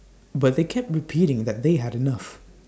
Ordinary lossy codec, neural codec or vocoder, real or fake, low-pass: none; none; real; none